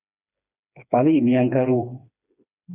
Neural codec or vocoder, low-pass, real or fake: codec, 16 kHz, 2 kbps, FreqCodec, smaller model; 3.6 kHz; fake